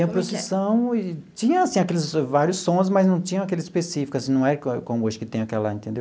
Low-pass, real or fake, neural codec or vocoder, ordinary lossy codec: none; real; none; none